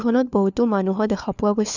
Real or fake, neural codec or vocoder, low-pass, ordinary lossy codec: fake; codec, 16 kHz, 4 kbps, FunCodec, trained on LibriTTS, 50 frames a second; 7.2 kHz; none